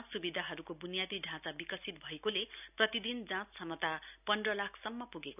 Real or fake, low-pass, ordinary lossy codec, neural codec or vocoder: real; 3.6 kHz; none; none